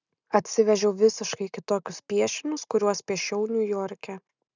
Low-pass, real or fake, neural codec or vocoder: 7.2 kHz; real; none